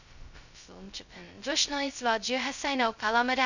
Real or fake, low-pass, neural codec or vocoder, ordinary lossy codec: fake; 7.2 kHz; codec, 16 kHz, 0.2 kbps, FocalCodec; none